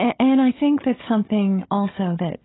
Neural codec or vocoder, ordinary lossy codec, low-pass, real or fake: codec, 16 kHz, 16 kbps, FunCodec, trained on Chinese and English, 50 frames a second; AAC, 16 kbps; 7.2 kHz; fake